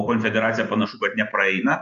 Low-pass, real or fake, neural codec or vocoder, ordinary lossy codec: 7.2 kHz; real; none; AAC, 96 kbps